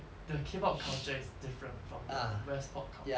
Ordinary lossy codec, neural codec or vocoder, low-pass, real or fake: none; none; none; real